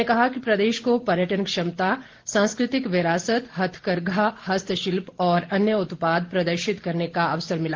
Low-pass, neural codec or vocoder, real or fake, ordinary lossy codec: 7.2 kHz; none; real; Opus, 16 kbps